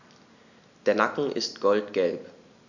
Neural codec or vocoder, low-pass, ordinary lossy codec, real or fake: none; 7.2 kHz; none; real